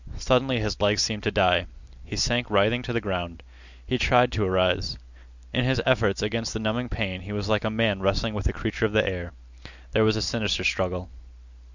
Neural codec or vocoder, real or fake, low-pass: none; real; 7.2 kHz